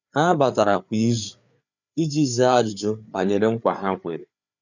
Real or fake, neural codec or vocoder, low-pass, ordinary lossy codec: fake; codec, 16 kHz, 4 kbps, FreqCodec, larger model; 7.2 kHz; none